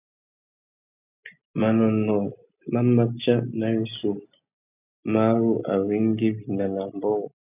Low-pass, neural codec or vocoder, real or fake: 3.6 kHz; none; real